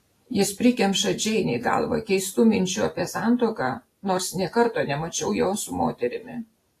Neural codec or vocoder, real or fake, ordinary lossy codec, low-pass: none; real; AAC, 48 kbps; 14.4 kHz